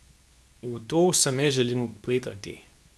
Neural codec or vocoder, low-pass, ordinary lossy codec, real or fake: codec, 24 kHz, 0.9 kbps, WavTokenizer, medium speech release version 2; none; none; fake